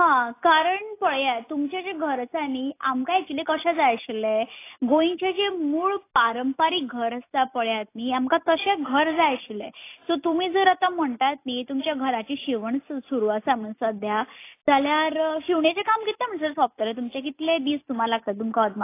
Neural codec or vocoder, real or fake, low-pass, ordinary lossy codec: none; real; 3.6 kHz; AAC, 24 kbps